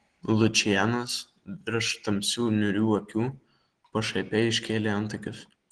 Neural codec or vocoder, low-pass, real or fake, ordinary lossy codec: none; 9.9 kHz; real; Opus, 16 kbps